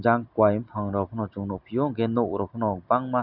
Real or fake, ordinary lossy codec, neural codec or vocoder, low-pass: real; none; none; 5.4 kHz